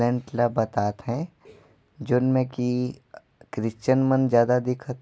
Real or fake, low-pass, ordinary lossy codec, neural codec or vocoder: real; none; none; none